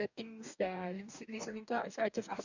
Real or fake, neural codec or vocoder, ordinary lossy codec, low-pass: fake; codec, 44.1 kHz, 2.6 kbps, DAC; none; 7.2 kHz